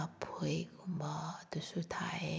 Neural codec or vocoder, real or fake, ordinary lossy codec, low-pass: none; real; none; none